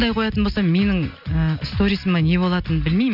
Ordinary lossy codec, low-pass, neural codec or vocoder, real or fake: none; 5.4 kHz; none; real